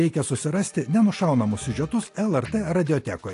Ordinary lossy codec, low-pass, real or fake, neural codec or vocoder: AAC, 48 kbps; 10.8 kHz; real; none